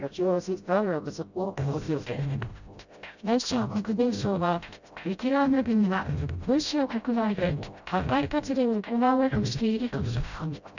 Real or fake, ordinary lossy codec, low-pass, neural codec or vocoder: fake; none; 7.2 kHz; codec, 16 kHz, 0.5 kbps, FreqCodec, smaller model